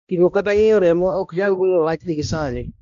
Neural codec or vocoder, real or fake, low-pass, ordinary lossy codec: codec, 16 kHz, 1 kbps, X-Codec, HuBERT features, trained on balanced general audio; fake; 7.2 kHz; MP3, 64 kbps